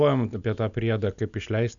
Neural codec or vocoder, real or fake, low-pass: none; real; 7.2 kHz